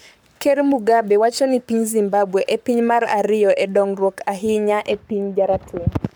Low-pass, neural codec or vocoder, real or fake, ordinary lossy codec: none; codec, 44.1 kHz, 7.8 kbps, Pupu-Codec; fake; none